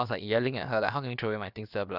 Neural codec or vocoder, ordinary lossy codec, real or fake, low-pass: codec, 16 kHz, about 1 kbps, DyCAST, with the encoder's durations; none; fake; 5.4 kHz